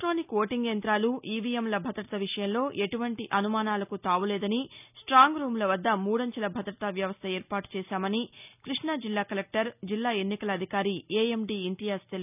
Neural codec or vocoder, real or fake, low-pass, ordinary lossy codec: none; real; 3.6 kHz; none